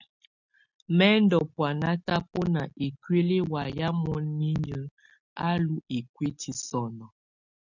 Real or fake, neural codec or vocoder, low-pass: real; none; 7.2 kHz